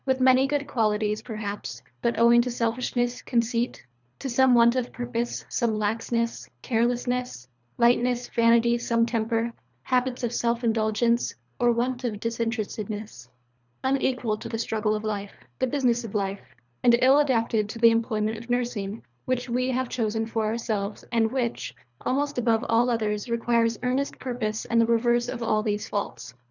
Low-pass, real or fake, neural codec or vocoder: 7.2 kHz; fake; codec, 24 kHz, 3 kbps, HILCodec